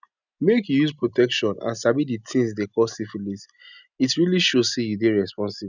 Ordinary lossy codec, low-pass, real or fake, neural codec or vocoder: none; 7.2 kHz; real; none